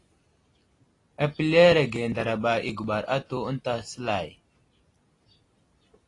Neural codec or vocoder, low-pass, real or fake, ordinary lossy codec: none; 10.8 kHz; real; AAC, 32 kbps